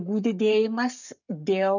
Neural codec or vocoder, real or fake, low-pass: codec, 44.1 kHz, 7.8 kbps, Pupu-Codec; fake; 7.2 kHz